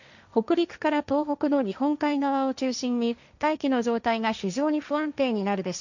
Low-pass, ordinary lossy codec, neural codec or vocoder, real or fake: 7.2 kHz; none; codec, 16 kHz, 1.1 kbps, Voila-Tokenizer; fake